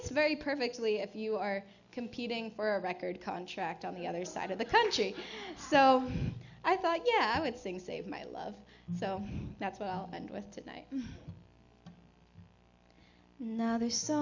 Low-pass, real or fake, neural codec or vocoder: 7.2 kHz; real; none